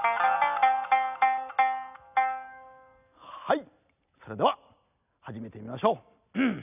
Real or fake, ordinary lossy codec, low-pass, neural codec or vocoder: real; none; 3.6 kHz; none